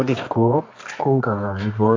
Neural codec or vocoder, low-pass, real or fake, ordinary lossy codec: codec, 24 kHz, 0.9 kbps, WavTokenizer, medium music audio release; 7.2 kHz; fake; MP3, 64 kbps